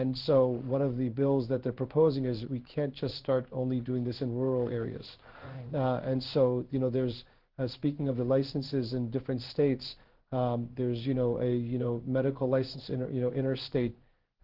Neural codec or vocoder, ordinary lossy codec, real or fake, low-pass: codec, 16 kHz in and 24 kHz out, 1 kbps, XY-Tokenizer; Opus, 16 kbps; fake; 5.4 kHz